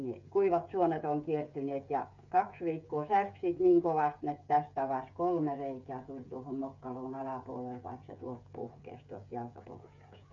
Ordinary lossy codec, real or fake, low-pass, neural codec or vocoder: none; fake; 7.2 kHz; codec, 16 kHz, 8 kbps, FreqCodec, smaller model